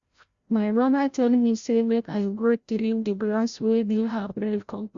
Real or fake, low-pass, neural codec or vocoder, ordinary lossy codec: fake; 7.2 kHz; codec, 16 kHz, 0.5 kbps, FreqCodec, larger model; Opus, 64 kbps